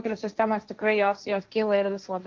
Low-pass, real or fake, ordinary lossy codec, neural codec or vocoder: 7.2 kHz; fake; Opus, 24 kbps; codec, 16 kHz, 1.1 kbps, Voila-Tokenizer